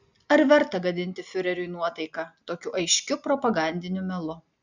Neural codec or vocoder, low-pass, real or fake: none; 7.2 kHz; real